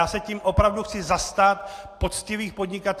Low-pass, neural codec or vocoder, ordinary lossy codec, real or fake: 14.4 kHz; none; AAC, 64 kbps; real